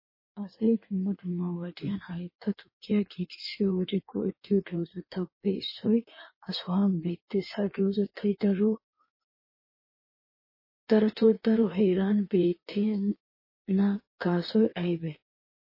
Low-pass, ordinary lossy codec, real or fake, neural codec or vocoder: 5.4 kHz; MP3, 24 kbps; fake; codec, 16 kHz in and 24 kHz out, 1.1 kbps, FireRedTTS-2 codec